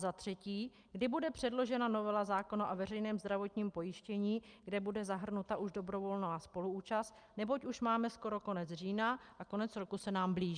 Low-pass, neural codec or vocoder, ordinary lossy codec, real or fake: 9.9 kHz; none; Opus, 32 kbps; real